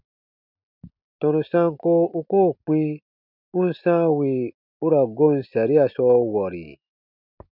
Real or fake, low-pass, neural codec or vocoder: real; 5.4 kHz; none